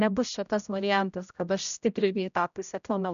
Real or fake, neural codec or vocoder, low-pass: fake; codec, 16 kHz, 0.5 kbps, X-Codec, HuBERT features, trained on general audio; 7.2 kHz